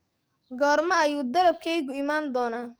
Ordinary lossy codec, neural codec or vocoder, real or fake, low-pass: none; codec, 44.1 kHz, 7.8 kbps, DAC; fake; none